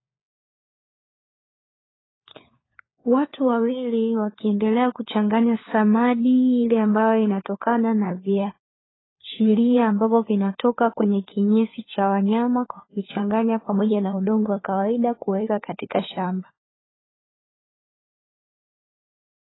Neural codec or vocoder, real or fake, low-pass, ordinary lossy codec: codec, 16 kHz, 4 kbps, FunCodec, trained on LibriTTS, 50 frames a second; fake; 7.2 kHz; AAC, 16 kbps